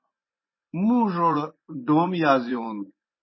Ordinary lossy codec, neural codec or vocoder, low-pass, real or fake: MP3, 24 kbps; vocoder, 24 kHz, 100 mel bands, Vocos; 7.2 kHz; fake